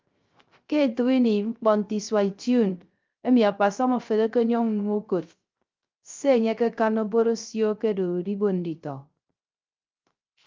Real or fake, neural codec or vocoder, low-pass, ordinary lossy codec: fake; codec, 16 kHz, 0.3 kbps, FocalCodec; 7.2 kHz; Opus, 24 kbps